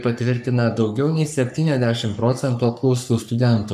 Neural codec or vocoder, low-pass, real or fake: codec, 44.1 kHz, 3.4 kbps, Pupu-Codec; 14.4 kHz; fake